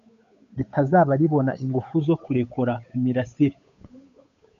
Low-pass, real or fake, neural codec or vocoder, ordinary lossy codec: 7.2 kHz; fake; codec, 16 kHz, 8 kbps, FunCodec, trained on Chinese and English, 25 frames a second; MP3, 64 kbps